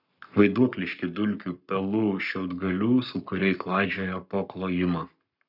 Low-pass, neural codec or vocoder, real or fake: 5.4 kHz; codec, 44.1 kHz, 7.8 kbps, Pupu-Codec; fake